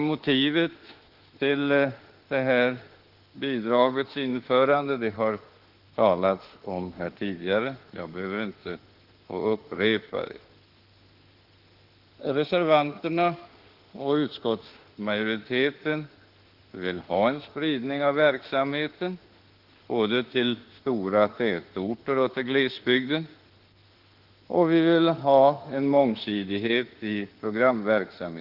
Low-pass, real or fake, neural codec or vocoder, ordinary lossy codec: 5.4 kHz; fake; autoencoder, 48 kHz, 32 numbers a frame, DAC-VAE, trained on Japanese speech; Opus, 16 kbps